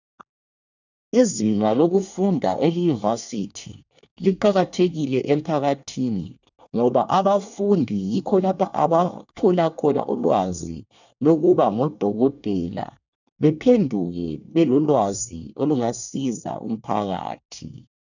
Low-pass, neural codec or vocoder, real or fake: 7.2 kHz; codec, 24 kHz, 1 kbps, SNAC; fake